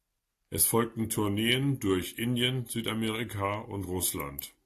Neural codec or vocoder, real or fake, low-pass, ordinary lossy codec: none; real; 14.4 kHz; AAC, 64 kbps